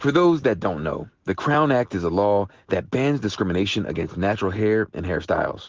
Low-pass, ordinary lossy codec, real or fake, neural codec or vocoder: 7.2 kHz; Opus, 16 kbps; real; none